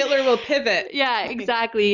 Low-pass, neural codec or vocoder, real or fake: 7.2 kHz; none; real